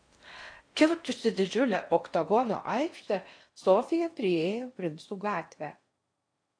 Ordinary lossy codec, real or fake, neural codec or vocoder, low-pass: AAC, 48 kbps; fake; codec, 16 kHz in and 24 kHz out, 0.6 kbps, FocalCodec, streaming, 4096 codes; 9.9 kHz